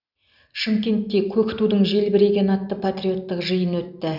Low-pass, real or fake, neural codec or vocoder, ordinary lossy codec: 5.4 kHz; real; none; none